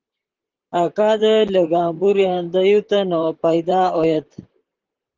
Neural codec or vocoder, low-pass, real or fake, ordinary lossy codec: vocoder, 44.1 kHz, 128 mel bands, Pupu-Vocoder; 7.2 kHz; fake; Opus, 16 kbps